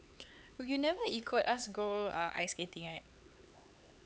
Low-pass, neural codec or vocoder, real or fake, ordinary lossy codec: none; codec, 16 kHz, 4 kbps, X-Codec, HuBERT features, trained on LibriSpeech; fake; none